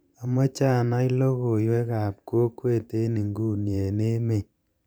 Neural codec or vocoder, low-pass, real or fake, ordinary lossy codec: vocoder, 44.1 kHz, 128 mel bands every 512 samples, BigVGAN v2; none; fake; none